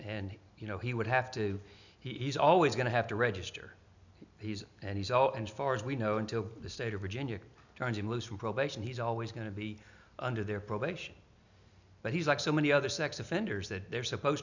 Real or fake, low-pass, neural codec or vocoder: real; 7.2 kHz; none